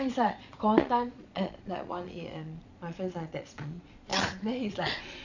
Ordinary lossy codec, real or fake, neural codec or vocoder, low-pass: none; fake; vocoder, 22.05 kHz, 80 mel bands, Vocos; 7.2 kHz